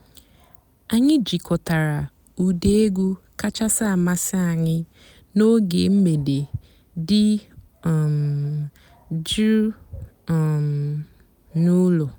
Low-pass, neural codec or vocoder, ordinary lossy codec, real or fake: none; none; none; real